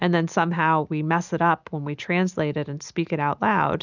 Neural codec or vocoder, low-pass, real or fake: none; 7.2 kHz; real